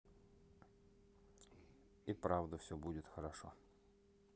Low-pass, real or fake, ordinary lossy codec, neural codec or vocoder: none; real; none; none